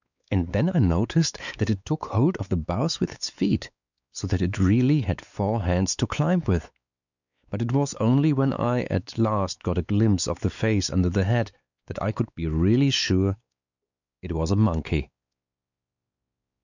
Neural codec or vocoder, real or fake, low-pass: codec, 16 kHz, 4 kbps, X-Codec, WavLM features, trained on Multilingual LibriSpeech; fake; 7.2 kHz